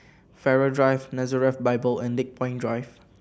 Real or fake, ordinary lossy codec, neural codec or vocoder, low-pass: real; none; none; none